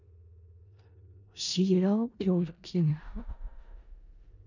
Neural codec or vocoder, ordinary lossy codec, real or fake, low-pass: codec, 16 kHz in and 24 kHz out, 0.4 kbps, LongCat-Audio-Codec, four codebook decoder; none; fake; 7.2 kHz